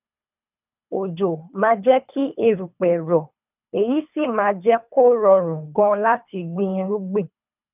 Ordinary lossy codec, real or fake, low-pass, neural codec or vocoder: none; fake; 3.6 kHz; codec, 24 kHz, 3 kbps, HILCodec